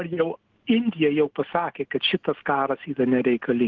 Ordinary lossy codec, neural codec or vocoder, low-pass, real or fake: Opus, 16 kbps; none; 7.2 kHz; real